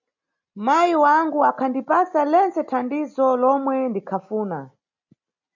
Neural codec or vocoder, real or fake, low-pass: none; real; 7.2 kHz